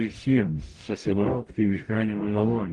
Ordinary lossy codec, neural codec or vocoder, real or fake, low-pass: Opus, 24 kbps; codec, 44.1 kHz, 0.9 kbps, DAC; fake; 10.8 kHz